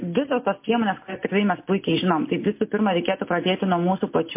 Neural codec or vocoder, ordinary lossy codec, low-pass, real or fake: none; MP3, 24 kbps; 3.6 kHz; real